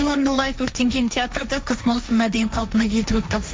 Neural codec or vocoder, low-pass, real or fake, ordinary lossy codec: codec, 16 kHz, 1.1 kbps, Voila-Tokenizer; none; fake; none